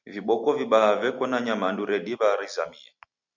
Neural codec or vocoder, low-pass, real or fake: none; 7.2 kHz; real